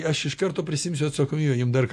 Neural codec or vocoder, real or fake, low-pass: none; real; 14.4 kHz